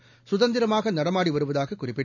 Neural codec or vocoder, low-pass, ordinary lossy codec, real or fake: none; 7.2 kHz; none; real